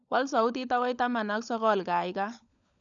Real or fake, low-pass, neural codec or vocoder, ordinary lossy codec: fake; 7.2 kHz; codec, 16 kHz, 16 kbps, FunCodec, trained on LibriTTS, 50 frames a second; none